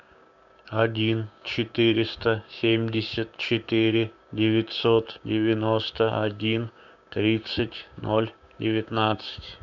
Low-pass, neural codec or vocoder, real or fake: 7.2 kHz; codec, 16 kHz, 6 kbps, DAC; fake